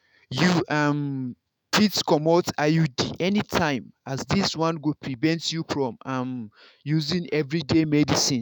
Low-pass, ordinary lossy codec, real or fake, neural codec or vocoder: none; none; fake; autoencoder, 48 kHz, 128 numbers a frame, DAC-VAE, trained on Japanese speech